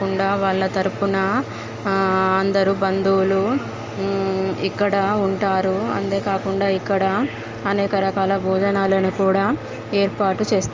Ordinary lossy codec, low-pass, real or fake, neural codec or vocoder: Opus, 32 kbps; 7.2 kHz; real; none